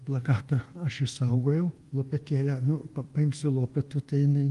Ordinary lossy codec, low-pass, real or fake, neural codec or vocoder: Opus, 24 kbps; 10.8 kHz; fake; codec, 24 kHz, 1.2 kbps, DualCodec